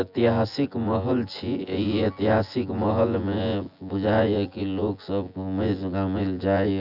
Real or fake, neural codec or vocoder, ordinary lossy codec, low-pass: fake; vocoder, 24 kHz, 100 mel bands, Vocos; none; 5.4 kHz